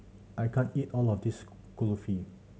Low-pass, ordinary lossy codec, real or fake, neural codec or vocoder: none; none; real; none